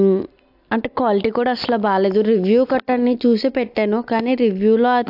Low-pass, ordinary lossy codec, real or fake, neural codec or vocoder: 5.4 kHz; AAC, 48 kbps; real; none